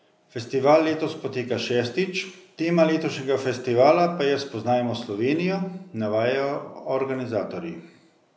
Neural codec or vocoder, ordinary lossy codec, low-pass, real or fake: none; none; none; real